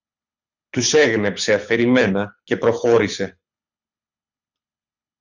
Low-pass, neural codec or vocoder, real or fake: 7.2 kHz; codec, 24 kHz, 6 kbps, HILCodec; fake